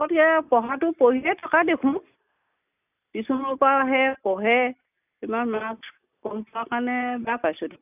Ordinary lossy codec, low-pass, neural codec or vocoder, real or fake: none; 3.6 kHz; none; real